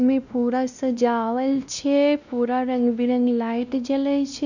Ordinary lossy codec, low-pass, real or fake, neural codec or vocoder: none; 7.2 kHz; fake; codec, 16 kHz, 1 kbps, X-Codec, WavLM features, trained on Multilingual LibriSpeech